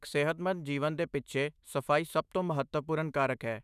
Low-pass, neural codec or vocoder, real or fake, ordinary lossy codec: 14.4 kHz; autoencoder, 48 kHz, 128 numbers a frame, DAC-VAE, trained on Japanese speech; fake; none